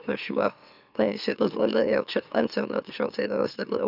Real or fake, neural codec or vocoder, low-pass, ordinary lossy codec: fake; autoencoder, 44.1 kHz, a latent of 192 numbers a frame, MeloTTS; 5.4 kHz; none